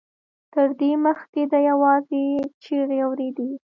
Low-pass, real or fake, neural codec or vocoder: 7.2 kHz; real; none